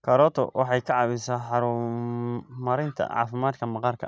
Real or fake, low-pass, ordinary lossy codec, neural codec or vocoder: real; none; none; none